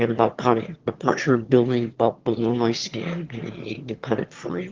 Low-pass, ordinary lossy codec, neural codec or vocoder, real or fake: 7.2 kHz; Opus, 16 kbps; autoencoder, 22.05 kHz, a latent of 192 numbers a frame, VITS, trained on one speaker; fake